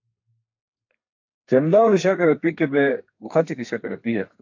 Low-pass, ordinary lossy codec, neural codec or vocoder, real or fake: 7.2 kHz; AAC, 48 kbps; codec, 32 kHz, 1.9 kbps, SNAC; fake